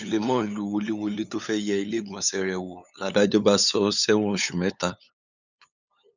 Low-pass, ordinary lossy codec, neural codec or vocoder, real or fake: 7.2 kHz; none; codec, 16 kHz, 8 kbps, FunCodec, trained on LibriTTS, 25 frames a second; fake